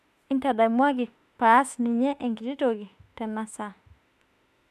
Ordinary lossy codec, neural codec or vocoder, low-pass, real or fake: MP3, 96 kbps; autoencoder, 48 kHz, 32 numbers a frame, DAC-VAE, trained on Japanese speech; 14.4 kHz; fake